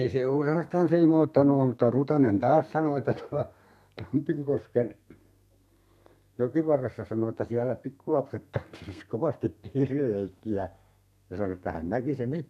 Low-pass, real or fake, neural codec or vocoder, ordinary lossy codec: 14.4 kHz; fake; codec, 32 kHz, 1.9 kbps, SNAC; none